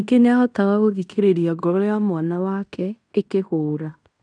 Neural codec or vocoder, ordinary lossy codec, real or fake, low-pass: codec, 16 kHz in and 24 kHz out, 0.9 kbps, LongCat-Audio-Codec, fine tuned four codebook decoder; none; fake; 9.9 kHz